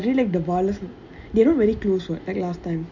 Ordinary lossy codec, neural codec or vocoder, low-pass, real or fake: none; none; 7.2 kHz; real